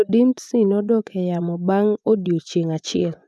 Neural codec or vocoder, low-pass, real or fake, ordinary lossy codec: vocoder, 24 kHz, 100 mel bands, Vocos; none; fake; none